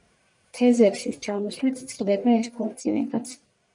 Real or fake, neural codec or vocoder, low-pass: fake; codec, 44.1 kHz, 1.7 kbps, Pupu-Codec; 10.8 kHz